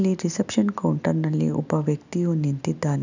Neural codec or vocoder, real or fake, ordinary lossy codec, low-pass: none; real; none; 7.2 kHz